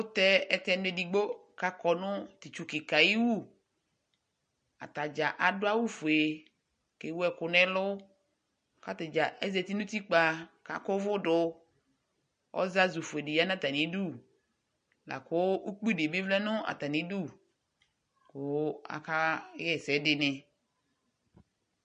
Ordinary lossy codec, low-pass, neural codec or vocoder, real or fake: MP3, 48 kbps; 9.9 kHz; none; real